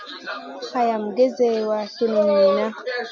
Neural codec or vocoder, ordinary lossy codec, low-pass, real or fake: none; MP3, 64 kbps; 7.2 kHz; real